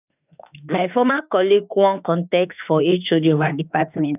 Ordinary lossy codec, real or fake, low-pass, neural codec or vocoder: none; fake; 3.6 kHz; autoencoder, 48 kHz, 32 numbers a frame, DAC-VAE, trained on Japanese speech